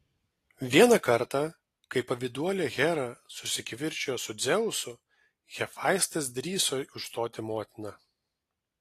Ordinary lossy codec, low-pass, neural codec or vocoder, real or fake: AAC, 48 kbps; 14.4 kHz; none; real